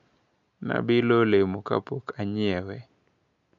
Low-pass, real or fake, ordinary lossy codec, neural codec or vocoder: 7.2 kHz; real; none; none